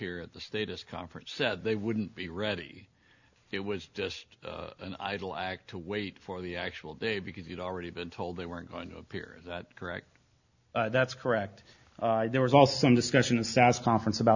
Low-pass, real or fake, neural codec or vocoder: 7.2 kHz; real; none